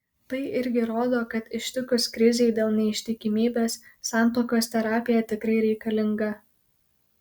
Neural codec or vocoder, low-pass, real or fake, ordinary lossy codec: none; 19.8 kHz; real; Opus, 64 kbps